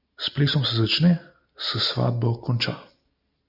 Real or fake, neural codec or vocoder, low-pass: real; none; 5.4 kHz